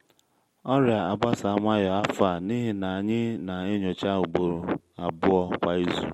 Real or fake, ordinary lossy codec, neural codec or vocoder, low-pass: real; MP3, 64 kbps; none; 19.8 kHz